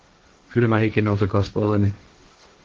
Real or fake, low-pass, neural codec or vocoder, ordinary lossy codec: fake; 7.2 kHz; codec, 16 kHz, 1.1 kbps, Voila-Tokenizer; Opus, 16 kbps